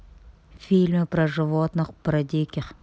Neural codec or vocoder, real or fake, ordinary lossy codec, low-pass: none; real; none; none